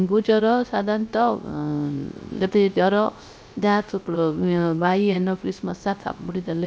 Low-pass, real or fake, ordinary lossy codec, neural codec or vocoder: none; fake; none; codec, 16 kHz, 0.3 kbps, FocalCodec